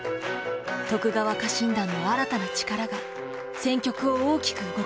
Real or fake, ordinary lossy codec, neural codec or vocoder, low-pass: real; none; none; none